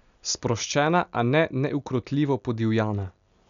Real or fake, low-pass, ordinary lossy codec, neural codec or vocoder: real; 7.2 kHz; none; none